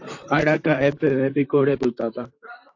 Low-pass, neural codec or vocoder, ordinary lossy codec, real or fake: 7.2 kHz; vocoder, 44.1 kHz, 128 mel bands, Pupu-Vocoder; AAC, 48 kbps; fake